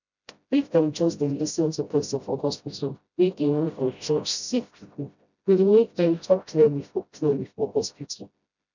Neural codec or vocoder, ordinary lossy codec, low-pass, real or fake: codec, 16 kHz, 0.5 kbps, FreqCodec, smaller model; none; 7.2 kHz; fake